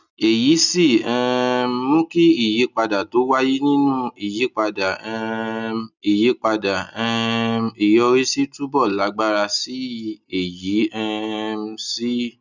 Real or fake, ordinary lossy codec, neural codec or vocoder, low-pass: real; none; none; 7.2 kHz